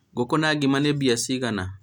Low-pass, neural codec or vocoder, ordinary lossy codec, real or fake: 19.8 kHz; none; none; real